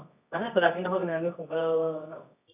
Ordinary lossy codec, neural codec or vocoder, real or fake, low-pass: none; codec, 24 kHz, 0.9 kbps, WavTokenizer, medium music audio release; fake; 3.6 kHz